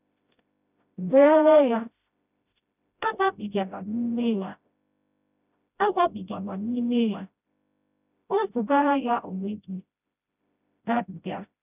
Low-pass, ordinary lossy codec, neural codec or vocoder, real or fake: 3.6 kHz; none; codec, 16 kHz, 0.5 kbps, FreqCodec, smaller model; fake